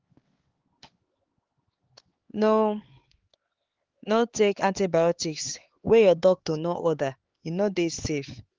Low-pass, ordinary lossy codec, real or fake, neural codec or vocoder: 7.2 kHz; Opus, 16 kbps; fake; codec, 16 kHz, 4 kbps, X-Codec, HuBERT features, trained on LibriSpeech